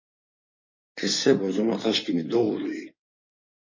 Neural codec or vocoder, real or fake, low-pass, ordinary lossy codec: codec, 16 kHz in and 24 kHz out, 2.2 kbps, FireRedTTS-2 codec; fake; 7.2 kHz; MP3, 32 kbps